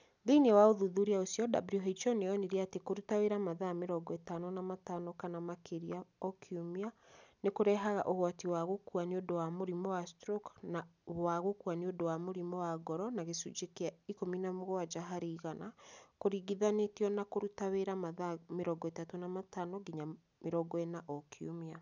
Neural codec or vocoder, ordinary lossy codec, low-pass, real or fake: none; none; 7.2 kHz; real